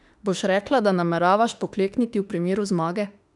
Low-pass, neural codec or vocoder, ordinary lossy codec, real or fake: 10.8 kHz; autoencoder, 48 kHz, 32 numbers a frame, DAC-VAE, trained on Japanese speech; none; fake